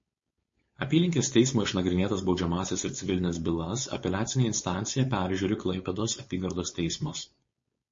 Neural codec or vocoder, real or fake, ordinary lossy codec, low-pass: codec, 16 kHz, 4.8 kbps, FACodec; fake; MP3, 32 kbps; 7.2 kHz